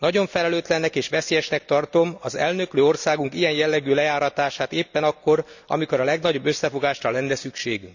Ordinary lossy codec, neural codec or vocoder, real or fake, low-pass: none; none; real; 7.2 kHz